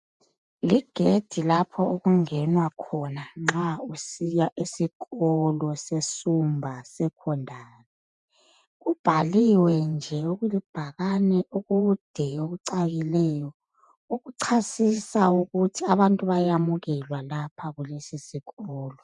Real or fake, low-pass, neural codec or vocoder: fake; 10.8 kHz; vocoder, 48 kHz, 128 mel bands, Vocos